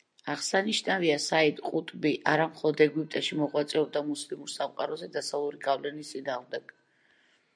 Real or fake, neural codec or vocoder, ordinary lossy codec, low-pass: real; none; MP3, 96 kbps; 9.9 kHz